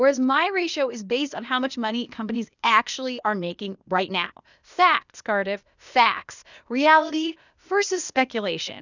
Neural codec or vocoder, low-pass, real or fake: codec, 16 kHz, 0.8 kbps, ZipCodec; 7.2 kHz; fake